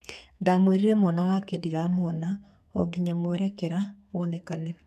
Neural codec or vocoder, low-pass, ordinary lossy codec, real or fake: codec, 32 kHz, 1.9 kbps, SNAC; 14.4 kHz; none; fake